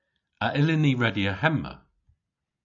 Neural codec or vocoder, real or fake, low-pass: none; real; 7.2 kHz